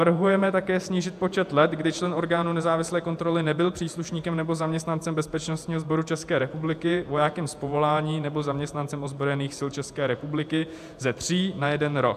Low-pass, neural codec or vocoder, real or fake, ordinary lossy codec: 14.4 kHz; vocoder, 48 kHz, 128 mel bands, Vocos; fake; AAC, 96 kbps